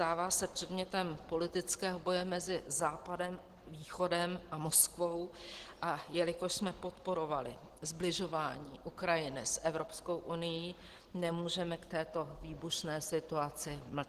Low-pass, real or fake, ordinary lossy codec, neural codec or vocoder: 14.4 kHz; real; Opus, 16 kbps; none